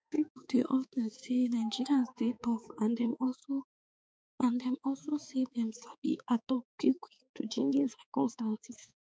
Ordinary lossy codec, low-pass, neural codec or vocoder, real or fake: none; none; codec, 16 kHz, 4 kbps, X-Codec, HuBERT features, trained on balanced general audio; fake